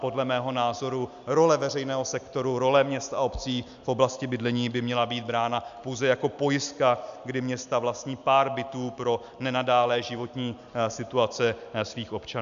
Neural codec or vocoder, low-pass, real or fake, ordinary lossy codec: none; 7.2 kHz; real; AAC, 96 kbps